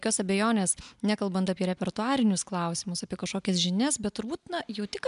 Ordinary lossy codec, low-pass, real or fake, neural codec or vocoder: MP3, 96 kbps; 10.8 kHz; real; none